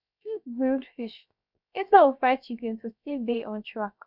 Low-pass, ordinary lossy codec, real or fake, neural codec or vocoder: 5.4 kHz; none; fake; codec, 16 kHz, about 1 kbps, DyCAST, with the encoder's durations